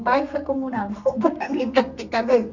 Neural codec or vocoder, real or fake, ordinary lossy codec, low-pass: codec, 32 kHz, 1.9 kbps, SNAC; fake; none; 7.2 kHz